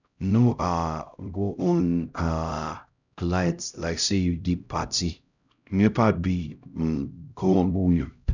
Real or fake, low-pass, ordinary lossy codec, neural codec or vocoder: fake; 7.2 kHz; none; codec, 16 kHz, 0.5 kbps, X-Codec, HuBERT features, trained on LibriSpeech